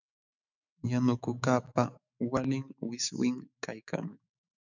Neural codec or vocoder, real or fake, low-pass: autoencoder, 48 kHz, 128 numbers a frame, DAC-VAE, trained on Japanese speech; fake; 7.2 kHz